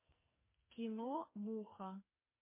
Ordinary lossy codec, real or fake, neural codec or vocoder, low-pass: MP3, 24 kbps; fake; codec, 44.1 kHz, 2.6 kbps, SNAC; 3.6 kHz